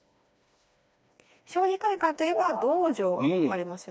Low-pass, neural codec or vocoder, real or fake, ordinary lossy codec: none; codec, 16 kHz, 2 kbps, FreqCodec, smaller model; fake; none